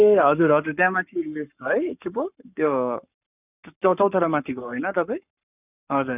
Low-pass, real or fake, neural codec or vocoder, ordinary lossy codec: 3.6 kHz; fake; codec, 44.1 kHz, 7.8 kbps, Pupu-Codec; none